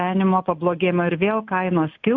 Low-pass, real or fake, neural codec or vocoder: 7.2 kHz; real; none